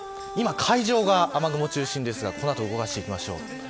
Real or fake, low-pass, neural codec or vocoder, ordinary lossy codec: real; none; none; none